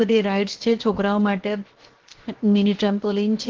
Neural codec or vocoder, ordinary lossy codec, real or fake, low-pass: codec, 16 kHz, 0.7 kbps, FocalCodec; Opus, 16 kbps; fake; 7.2 kHz